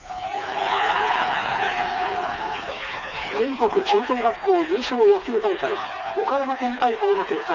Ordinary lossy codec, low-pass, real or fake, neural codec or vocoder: none; 7.2 kHz; fake; codec, 16 kHz, 2 kbps, FreqCodec, smaller model